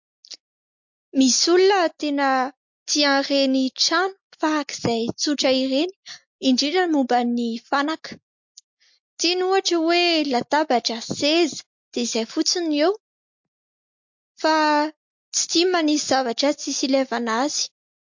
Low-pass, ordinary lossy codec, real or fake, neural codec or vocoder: 7.2 kHz; MP3, 48 kbps; real; none